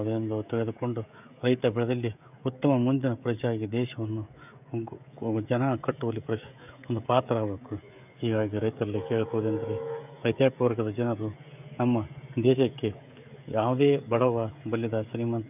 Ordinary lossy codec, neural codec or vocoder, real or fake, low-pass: none; codec, 16 kHz, 16 kbps, FreqCodec, smaller model; fake; 3.6 kHz